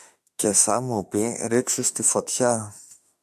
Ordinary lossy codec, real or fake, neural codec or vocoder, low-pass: AAC, 96 kbps; fake; autoencoder, 48 kHz, 32 numbers a frame, DAC-VAE, trained on Japanese speech; 14.4 kHz